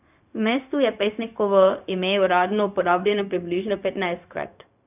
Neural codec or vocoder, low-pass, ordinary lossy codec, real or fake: codec, 16 kHz, 0.4 kbps, LongCat-Audio-Codec; 3.6 kHz; none; fake